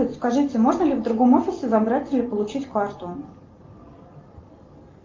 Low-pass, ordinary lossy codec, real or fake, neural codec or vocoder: 7.2 kHz; Opus, 24 kbps; real; none